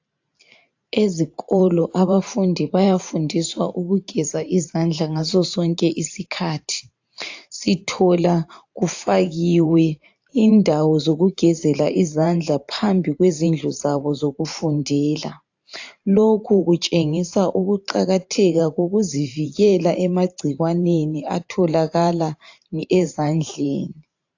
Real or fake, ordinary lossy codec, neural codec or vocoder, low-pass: fake; AAC, 48 kbps; vocoder, 44.1 kHz, 128 mel bands every 512 samples, BigVGAN v2; 7.2 kHz